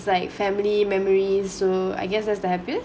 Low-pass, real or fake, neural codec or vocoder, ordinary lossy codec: none; real; none; none